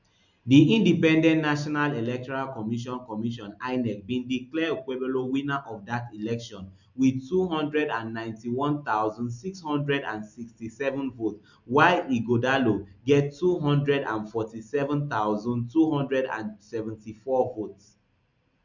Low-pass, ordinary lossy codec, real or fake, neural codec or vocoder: 7.2 kHz; none; real; none